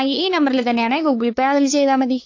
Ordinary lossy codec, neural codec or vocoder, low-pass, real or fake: AAC, 48 kbps; codec, 16 kHz, 2 kbps, FunCodec, trained on LibriTTS, 25 frames a second; 7.2 kHz; fake